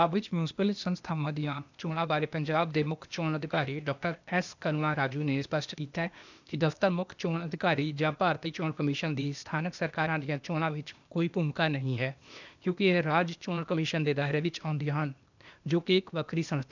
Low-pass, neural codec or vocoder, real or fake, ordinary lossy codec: 7.2 kHz; codec, 16 kHz, 0.8 kbps, ZipCodec; fake; none